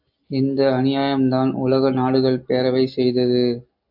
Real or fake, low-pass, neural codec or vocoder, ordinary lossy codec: real; 5.4 kHz; none; AAC, 48 kbps